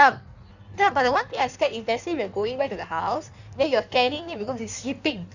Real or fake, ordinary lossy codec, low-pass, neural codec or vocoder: fake; none; 7.2 kHz; codec, 16 kHz in and 24 kHz out, 1.1 kbps, FireRedTTS-2 codec